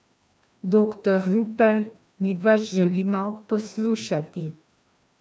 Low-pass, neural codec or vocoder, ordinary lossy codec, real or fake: none; codec, 16 kHz, 1 kbps, FreqCodec, larger model; none; fake